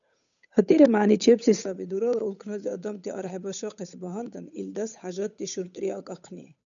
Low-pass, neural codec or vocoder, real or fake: 7.2 kHz; codec, 16 kHz, 8 kbps, FunCodec, trained on Chinese and English, 25 frames a second; fake